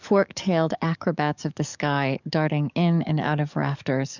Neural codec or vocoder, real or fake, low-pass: codec, 44.1 kHz, 7.8 kbps, DAC; fake; 7.2 kHz